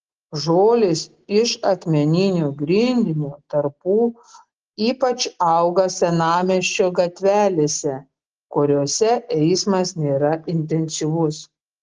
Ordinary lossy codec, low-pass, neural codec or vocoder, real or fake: Opus, 16 kbps; 7.2 kHz; none; real